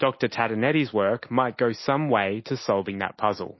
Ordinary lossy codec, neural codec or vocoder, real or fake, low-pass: MP3, 24 kbps; none; real; 7.2 kHz